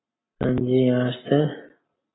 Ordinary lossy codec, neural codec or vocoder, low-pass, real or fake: AAC, 16 kbps; none; 7.2 kHz; real